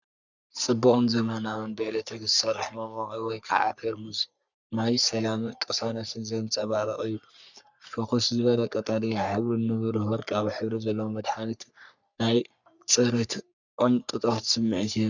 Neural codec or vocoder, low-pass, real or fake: codec, 44.1 kHz, 3.4 kbps, Pupu-Codec; 7.2 kHz; fake